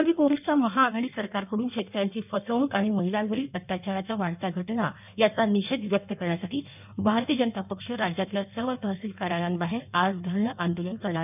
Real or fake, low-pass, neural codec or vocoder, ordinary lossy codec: fake; 3.6 kHz; codec, 16 kHz in and 24 kHz out, 1.1 kbps, FireRedTTS-2 codec; none